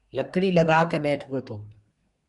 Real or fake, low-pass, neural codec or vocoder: fake; 10.8 kHz; codec, 24 kHz, 1 kbps, SNAC